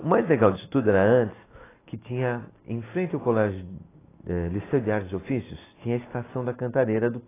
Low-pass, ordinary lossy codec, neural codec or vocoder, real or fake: 3.6 kHz; AAC, 16 kbps; none; real